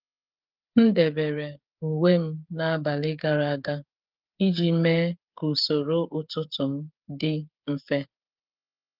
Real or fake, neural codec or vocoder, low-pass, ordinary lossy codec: fake; codec, 16 kHz, 8 kbps, FreqCodec, smaller model; 5.4 kHz; Opus, 32 kbps